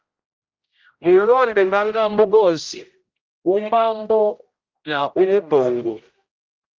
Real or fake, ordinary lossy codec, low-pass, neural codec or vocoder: fake; Opus, 32 kbps; 7.2 kHz; codec, 16 kHz, 0.5 kbps, X-Codec, HuBERT features, trained on general audio